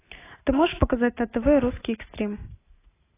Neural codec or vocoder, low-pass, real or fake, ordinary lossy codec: none; 3.6 kHz; real; AAC, 16 kbps